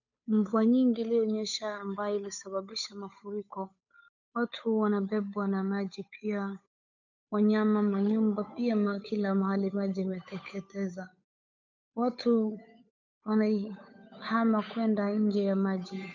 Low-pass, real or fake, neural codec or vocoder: 7.2 kHz; fake; codec, 16 kHz, 8 kbps, FunCodec, trained on Chinese and English, 25 frames a second